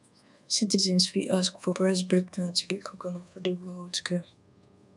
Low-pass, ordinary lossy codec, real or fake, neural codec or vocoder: 10.8 kHz; none; fake; codec, 24 kHz, 1.2 kbps, DualCodec